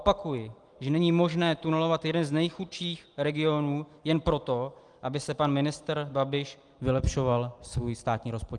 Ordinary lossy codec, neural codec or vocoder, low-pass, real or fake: Opus, 24 kbps; none; 9.9 kHz; real